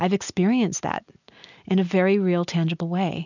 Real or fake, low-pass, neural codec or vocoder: real; 7.2 kHz; none